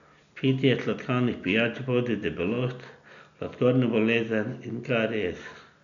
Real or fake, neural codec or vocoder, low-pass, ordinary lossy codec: real; none; 7.2 kHz; none